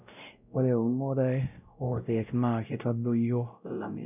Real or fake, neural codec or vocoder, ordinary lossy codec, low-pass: fake; codec, 16 kHz, 0.5 kbps, X-Codec, WavLM features, trained on Multilingual LibriSpeech; none; 3.6 kHz